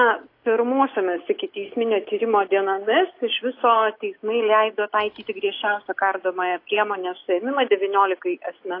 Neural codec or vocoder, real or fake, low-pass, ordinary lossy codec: none; real; 5.4 kHz; AAC, 32 kbps